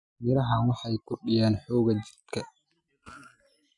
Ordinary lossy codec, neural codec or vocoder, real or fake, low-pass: none; none; real; 10.8 kHz